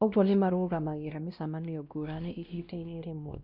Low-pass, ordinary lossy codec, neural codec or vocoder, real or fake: 5.4 kHz; none; codec, 16 kHz, 1 kbps, X-Codec, WavLM features, trained on Multilingual LibriSpeech; fake